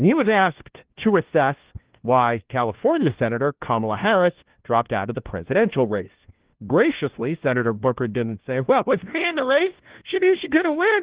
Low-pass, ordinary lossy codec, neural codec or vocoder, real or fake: 3.6 kHz; Opus, 16 kbps; codec, 16 kHz, 1 kbps, FunCodec, trained on LibriTTS, 50 frames a second; fake